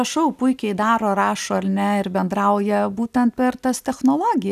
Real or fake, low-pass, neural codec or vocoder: real; 14.4 kHz; none